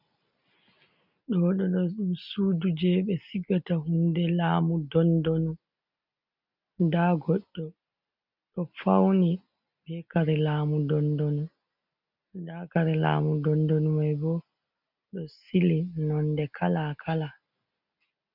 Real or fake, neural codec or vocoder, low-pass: real; none; 5.4 kHz